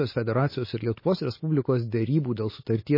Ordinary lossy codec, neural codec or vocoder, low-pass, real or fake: MP3, 24 kbps; none; 5.4 kHz; real